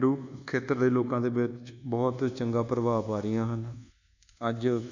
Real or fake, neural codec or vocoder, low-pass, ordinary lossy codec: fake; codec, 24 kHz, 1.2 kbps, DualCodec; 7.2 kHz; none